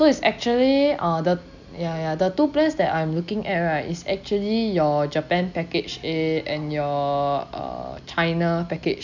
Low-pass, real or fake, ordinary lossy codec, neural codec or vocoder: 7.2 kHz; real; none; none